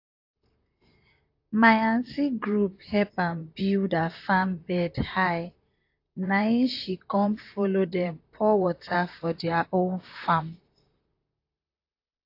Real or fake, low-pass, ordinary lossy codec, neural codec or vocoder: fake; 5.4 kHz; AAC, 32 kbps; vocoder, 44.1 kHz, 128 mel bands, Pupu-Vocoder